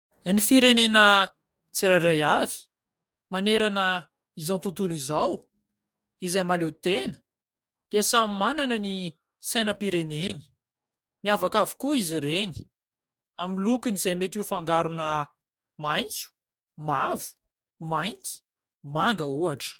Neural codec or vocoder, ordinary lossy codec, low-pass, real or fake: codec, 44.1 kHz, 2.6 kbps, DAC; MP3, 96 kbps; 19.8 kHz; fake